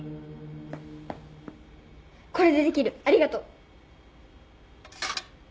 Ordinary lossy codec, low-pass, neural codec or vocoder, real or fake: none; none; none; real